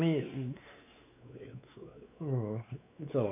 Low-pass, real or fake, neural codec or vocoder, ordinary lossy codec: 3.6 kHz; fake; codec, 16 kHz, 4 kbps, X-Codec, WavLM features, trained on Multilingual LibriSpeech; none